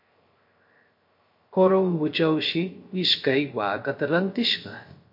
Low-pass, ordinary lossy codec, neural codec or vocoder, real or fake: 5.4 kHz; MP3, 32 kbps; codec, 16 kHz, 0.3 kbps, FocalCodec; fake